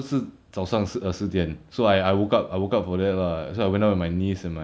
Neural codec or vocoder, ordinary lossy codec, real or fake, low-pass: none; none; real; none